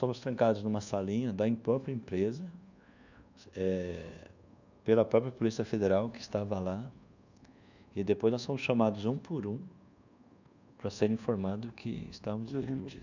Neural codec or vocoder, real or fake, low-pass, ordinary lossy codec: codec, 24 kHz, 1.2 kbps, DualCodec; fake; 7.2 kHz; none